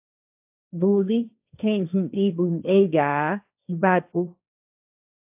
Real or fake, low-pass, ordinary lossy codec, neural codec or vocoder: fake; 3.6 kHz; AAC, 32 kbps; codec, 16 kHz, 1.1 kbps, Voila-Tokenizer